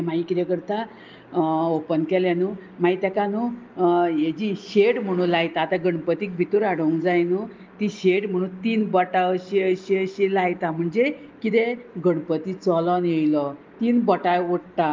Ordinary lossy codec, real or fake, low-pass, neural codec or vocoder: Opus, 32 kbps; real; 7.2 kHz; none